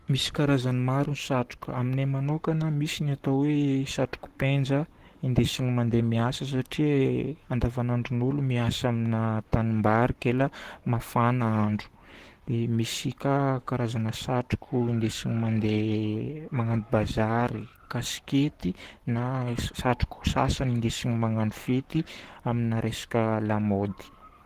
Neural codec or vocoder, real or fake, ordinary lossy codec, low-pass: codec, 44.1 kHz, 7.8 kbps, Pupu-Codec; fake; Opus, 16 kbps; 14.4 kHz